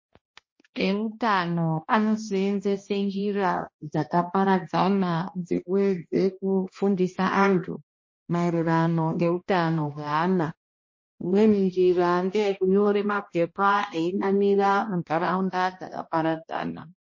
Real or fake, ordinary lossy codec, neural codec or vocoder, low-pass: fake; MP3, 32 kbps; codec, 16 kHz, 1 kbps, X-Codec, HuBERT features, trained on balanced general audio; 7.2 kHz